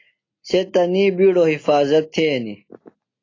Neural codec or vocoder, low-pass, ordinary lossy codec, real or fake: none; 7.2 kHz; AAC, 32 kbps; real